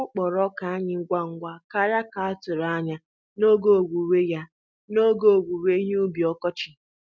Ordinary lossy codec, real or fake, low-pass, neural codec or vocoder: none; real; none; none